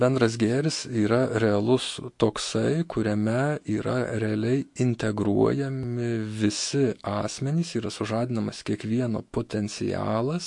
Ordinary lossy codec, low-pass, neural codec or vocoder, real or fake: MP3, 48 kbps; 10.8 kHz; autoencoder, 48 kHz, 128 numbers a frame, DAC-VAE, trained on Japanese speech; fake